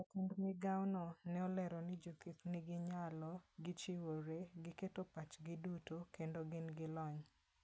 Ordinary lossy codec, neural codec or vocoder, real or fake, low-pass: none; none; real; none